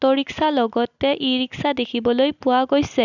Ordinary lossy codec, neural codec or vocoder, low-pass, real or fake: none; none; 7.2 kHz; real